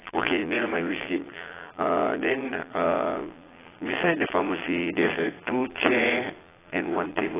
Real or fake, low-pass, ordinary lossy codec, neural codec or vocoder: fake; 3.6 kHz; AAC, 16 kbps; vocoder, 22.05 kHz, 80 mel bands, Vocos